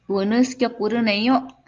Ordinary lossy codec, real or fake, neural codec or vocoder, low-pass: Opus, 32 kbps; real; none; 7.2 kHz